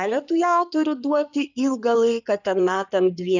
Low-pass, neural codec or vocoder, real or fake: 7.2 kHz; codec, 16 kHz in and 24 kHz out, 2.2 kbps, FireRedTTS-2 codec; fake